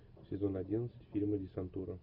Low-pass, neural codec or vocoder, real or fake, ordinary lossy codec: 5.4 kHz; none; real; MP3, 48 kbps